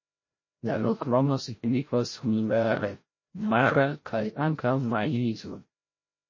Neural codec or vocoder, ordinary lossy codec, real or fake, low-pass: codec, 16 kHz, 0.5 kbps, FreqCodec, larger model; MP3, 32 kbps; fake; 7.2 kHz